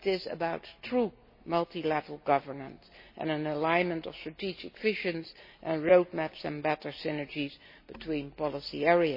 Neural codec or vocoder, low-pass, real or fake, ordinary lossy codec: none; 5.4 kHz; real; MP3, 24 kbps